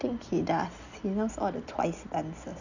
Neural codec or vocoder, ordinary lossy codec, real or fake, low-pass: none; none; real; 7.2 kHz